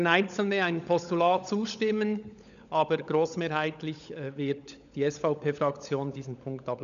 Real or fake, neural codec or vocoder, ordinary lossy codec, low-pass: fake; codec, 16 kHz, 16 kbps, FunCodec, trained on LibriTTS, 50 frames a second; none; 7.2 kHz